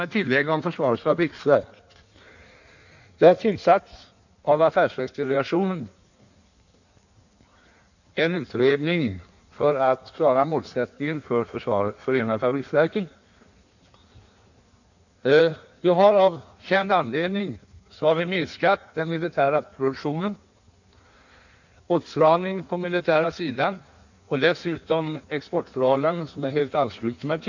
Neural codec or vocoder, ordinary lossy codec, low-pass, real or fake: codec, 16 kHz in and 24 kHz out, 1.1 kbps, FireRedTTS-2 codec; none; 7.2 kHz; fake